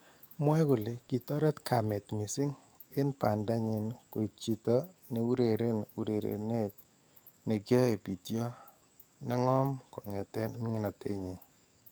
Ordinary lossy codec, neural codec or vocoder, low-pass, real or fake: none; codec, 44.1 kHz, 7.8 kbps, DAC; none; fake